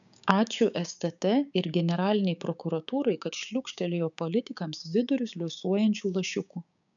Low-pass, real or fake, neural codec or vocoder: 7.2 kHz; fake; codec, 16 kHz, 6 kbps, DAC